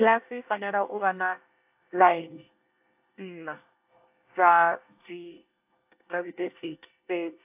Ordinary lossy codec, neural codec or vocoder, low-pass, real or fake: AAC, 32 kbps; codec, 24 kHz, 1 kbps, SNAC; 3.6 kHz; fake